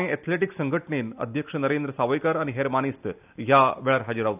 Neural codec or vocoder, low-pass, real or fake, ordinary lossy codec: none; 3.6 kHz; real; none